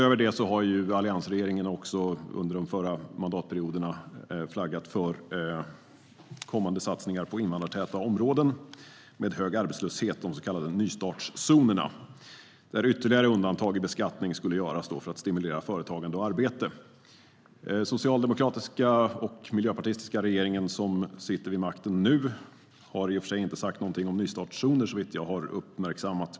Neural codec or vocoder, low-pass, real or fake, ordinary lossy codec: none; none; real; none